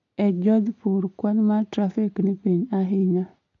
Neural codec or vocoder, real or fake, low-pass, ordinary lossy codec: none; real; 7.2 kHz; AAC, 48 kbps